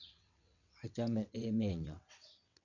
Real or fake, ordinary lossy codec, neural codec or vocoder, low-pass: fake; none; vocoder, 22.05 kHz, 80 mel bands, WaveNeXt; 7.2 kHz